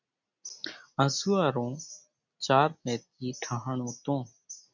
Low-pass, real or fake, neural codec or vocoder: 7.2 kHz; real; none